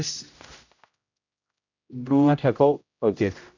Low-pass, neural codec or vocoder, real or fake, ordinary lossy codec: 7.2 kHz; codec, 16 kHz, 0.5 kbps, X-Codec, HuBERT features, trained on general audio; fake; none